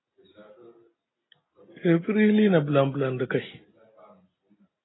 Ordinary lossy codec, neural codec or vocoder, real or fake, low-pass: AAC, 16 kbps; none; real; 7.2 kHz